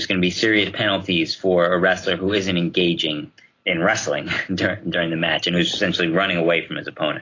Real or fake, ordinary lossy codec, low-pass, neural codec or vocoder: real; AAC, 32 kbps; 7.2 kHz; none